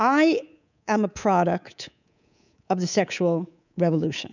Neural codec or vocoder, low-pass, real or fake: codec, 24 kHz, 3.1 kbps, DualCodec; 7.2 kHz; fake